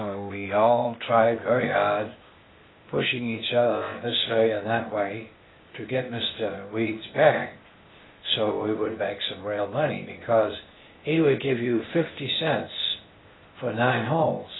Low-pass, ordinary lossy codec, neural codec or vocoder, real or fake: 7.2 kHz; AAC, 16 kbps; codec, 16 kHz, 0.8 kbps, ZipCodec; fake